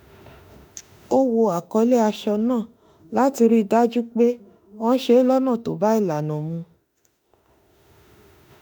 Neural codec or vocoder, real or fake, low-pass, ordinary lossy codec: autoencoder, 48 kHz, 32 numbers a frame, DAC-VAE, trained on Japanese speech; fake; 19.8 kHz; none